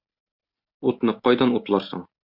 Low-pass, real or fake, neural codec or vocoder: 5.4 kHz; real; none